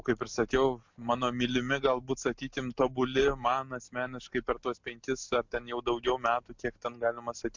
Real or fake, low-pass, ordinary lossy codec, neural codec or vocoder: real; 7.2 kHz; MP3, 64 kbps; none